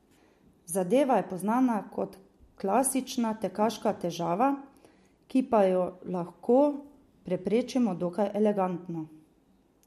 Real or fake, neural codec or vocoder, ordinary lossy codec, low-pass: real; none; MP3, 64 kbps; 14.4 kHz